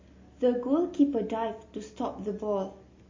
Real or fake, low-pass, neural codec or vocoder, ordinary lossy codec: real; 7.2 kHz; none; MP3, 32 kbps